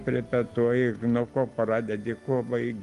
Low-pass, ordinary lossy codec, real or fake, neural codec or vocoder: 10.8 kHz; Opus, 24 kbps; real; none